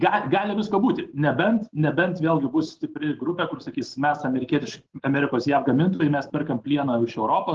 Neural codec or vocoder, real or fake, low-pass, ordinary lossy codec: none; real; 7.2 kHz; Opus, 16 kbps